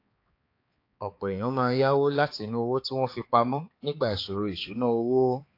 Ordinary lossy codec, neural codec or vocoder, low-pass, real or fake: AAC, 32 kbps; codec, 16 kHz, 4 kbps, X-Codec, HuBERT features, trained on balanced general audio; 5.4 kHz; fake